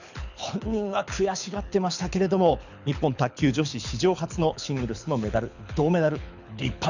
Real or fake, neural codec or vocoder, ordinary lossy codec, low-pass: fake; codec, 24 kHz, 6 kbps, HILCodec; none; 7.2 kHz